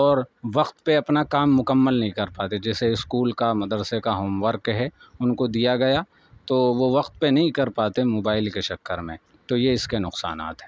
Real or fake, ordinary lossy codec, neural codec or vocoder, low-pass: real; none; none; none